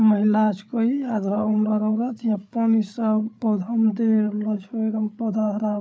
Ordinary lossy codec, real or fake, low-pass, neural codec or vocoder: none; fake; none; codec, 16 kHz, 8 kbps, FreqCodec, larger model